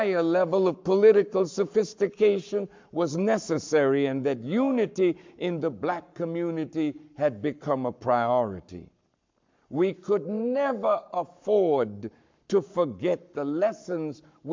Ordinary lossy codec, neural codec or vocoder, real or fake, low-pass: MP3, 64 kbps; codec, 44.1 kHz, 7.8 kbps, Pupu-Codec; fake; 7.2 kHz